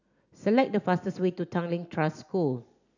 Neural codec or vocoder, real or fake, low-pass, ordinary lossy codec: none; real; 7.2 kHz; none